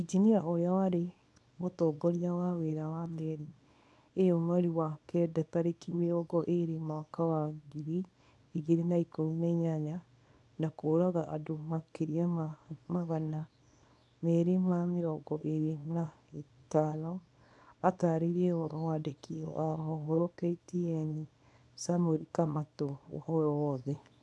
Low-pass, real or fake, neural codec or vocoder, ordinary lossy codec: none; fake; codec, 24 kHz, 0.9 kbps, WavTokenizer, small release; none